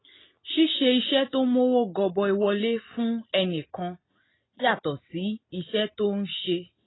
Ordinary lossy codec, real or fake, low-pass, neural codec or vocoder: AAC, 16 kbps; real; 7.2 kHz; none